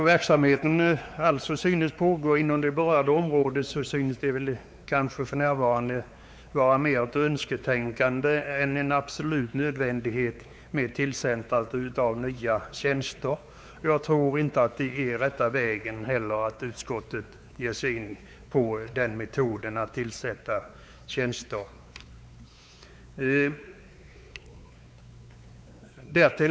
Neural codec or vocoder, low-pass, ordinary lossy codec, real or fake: codec, 16 kHz, 4 kbps, X-Codec, WavLM features, trained on Multilingual LibriSpeech; none; none; fake